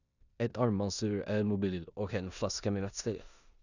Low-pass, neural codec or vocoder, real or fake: 7.2 kHz; codec, 16 kHz in and 24 kHz out, 0.9 kbps, LongCat-Audio-Codec, four codebook decoder; fake